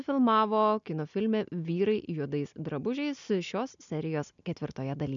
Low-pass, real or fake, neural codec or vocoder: 7.2 kHz; real; none